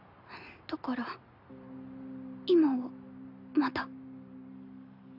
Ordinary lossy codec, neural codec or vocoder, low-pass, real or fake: none; none; 5.4 kHz; real